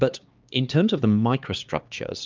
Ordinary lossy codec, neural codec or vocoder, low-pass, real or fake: Opus, 24 kbps; codec, 16 kHz, 2 kbps, X-Codec, HuBERT features, trained on LibriSpeech; 7.2 kHz; fake